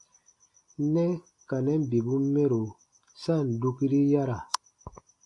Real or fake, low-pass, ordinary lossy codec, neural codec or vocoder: real; 10.8 kHz; AAC, 48 kbps; none